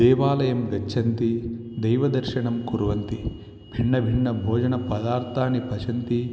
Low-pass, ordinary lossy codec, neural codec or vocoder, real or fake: none; none; none; real